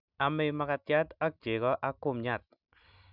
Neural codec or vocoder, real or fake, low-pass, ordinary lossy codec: none; real; 5.4 kHz; none